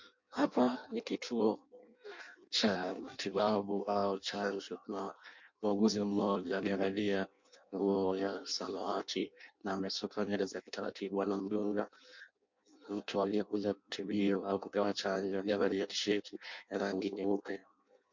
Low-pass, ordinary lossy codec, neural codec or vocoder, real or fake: 7.2 kHz; MP3, 48 kbps; codec, 16 kHz in and 24 kHz out, 0.6 kbps, FireRedTTS-2 codec; fake